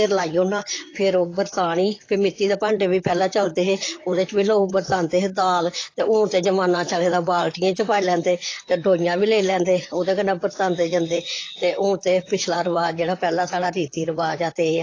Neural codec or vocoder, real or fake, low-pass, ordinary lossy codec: vocoder, 44.1 kHz, 128 mel bands, Pupu-Vocoder; fake; 7.2 kHz; AAC, 32 kbps